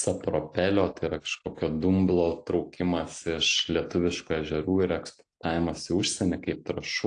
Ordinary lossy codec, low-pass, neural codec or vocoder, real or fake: AAC, 48 kbps; 9.9 kHz; none; real